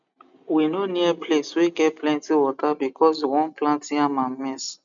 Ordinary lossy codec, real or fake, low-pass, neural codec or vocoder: none; real; 7.2 kHz; none